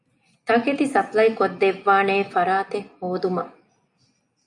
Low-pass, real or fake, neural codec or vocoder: 10.8 kHz; real; none